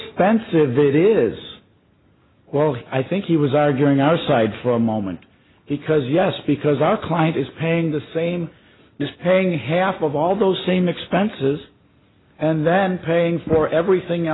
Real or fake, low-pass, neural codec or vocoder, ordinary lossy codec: real; 7.2 kHz; none; AAC, 16 kbps